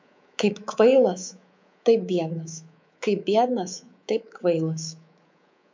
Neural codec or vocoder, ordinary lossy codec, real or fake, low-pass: codec, 24 kHz, 3.1 kbps, DualCodec; MP3, 64 kbps; fake; 7.2 kHz